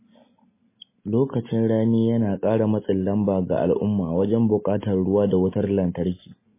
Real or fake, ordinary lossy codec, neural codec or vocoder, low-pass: real; MP3, 16 kbps; none; 3.6 kHz